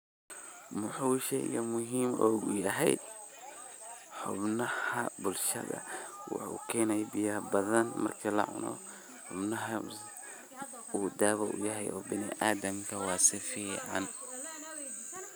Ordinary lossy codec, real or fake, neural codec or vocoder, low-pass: none; real; none; none